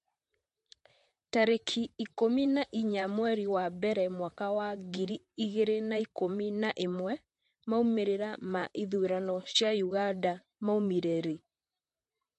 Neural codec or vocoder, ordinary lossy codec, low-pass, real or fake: vocoder, 44.1 kHz, 128 mel bands, Pupu-Vocoder; MP3, 48 kbps; 14.4 kHz; fake